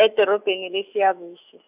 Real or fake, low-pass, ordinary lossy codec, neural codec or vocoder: fake; 3.6 kHz; none; autoencoder, 48 kHz, 128 numbers a frame, DAC-VAE, trained on Japanese speech